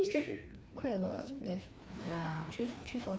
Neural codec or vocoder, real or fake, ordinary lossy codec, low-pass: codec, 16 kHz, 2 kbps, FreqCodec, larger model; fake; none; none